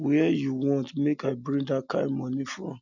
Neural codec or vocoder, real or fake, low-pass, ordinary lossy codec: none; real; 7.2 kHz; none